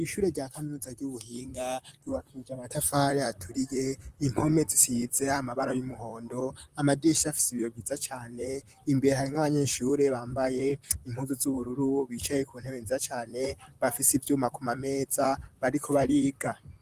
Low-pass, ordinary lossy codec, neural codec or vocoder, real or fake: 14.4 kHz; Opus, 24 kbps; vocoder, 44.1 kHz, 128 mel bands, Pupu-Vocoder; fake